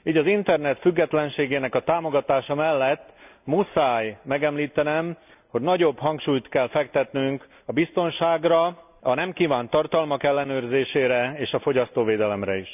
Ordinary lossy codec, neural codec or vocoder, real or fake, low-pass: none; none; real; 3.6 kHz